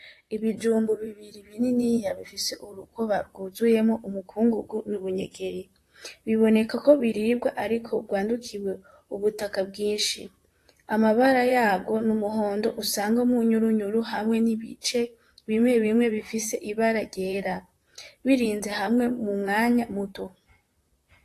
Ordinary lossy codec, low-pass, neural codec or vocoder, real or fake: AAC, 48 kbps; 14.4 kHz; vocoder, 44.1 kHz, 128 mel bands, Pupu-Vocoder; fake